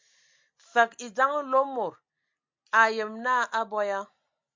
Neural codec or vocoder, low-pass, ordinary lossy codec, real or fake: none; 7.2 kHz; MP3, 64 kbps; real